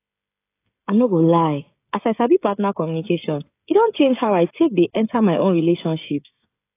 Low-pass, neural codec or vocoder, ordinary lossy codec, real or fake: 3.6 kHz; codec, 16 kHz, 16 kbps, FreqCodec, smaller model; AAC, 24 kbps; fake